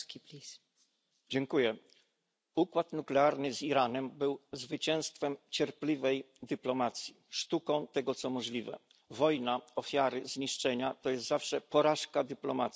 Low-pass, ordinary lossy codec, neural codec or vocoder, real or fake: none; none; none; real